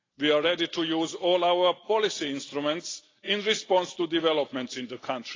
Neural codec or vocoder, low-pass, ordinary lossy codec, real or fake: none; 7.2 kHz; AAC, 32 kbps; real